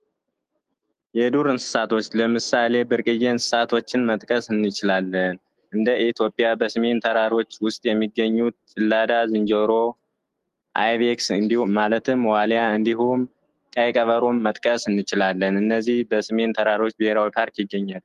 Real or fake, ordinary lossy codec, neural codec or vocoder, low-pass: fake; Opus, 24 kbps; autoencoder, 48 kHz, 128 numbers a frame, DAC-VAE, trained on Japanese speech; 14.4 kHz